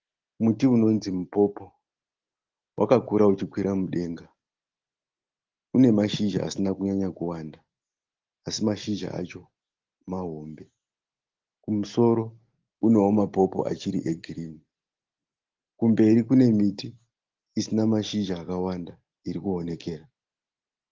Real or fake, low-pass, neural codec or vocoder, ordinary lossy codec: real; 7.2 kHz; none; Opus, 16 kbps